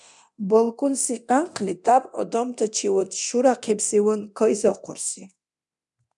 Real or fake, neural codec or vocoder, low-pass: fake; codec, 24 kHz, 0.9 kbps, DualCodec; 10.8 kHz